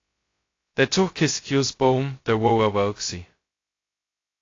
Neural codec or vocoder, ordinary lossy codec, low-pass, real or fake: codec, 16 kHz, 0.2 kbps, FocalCodec; AAC, 32 kbps; 7.2 kHz; fake